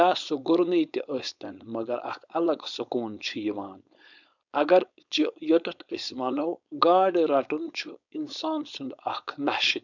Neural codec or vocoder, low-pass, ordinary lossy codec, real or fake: codec, 16 kHz, 4.8 kbps, FACodec; 7.2 kHz; none; fake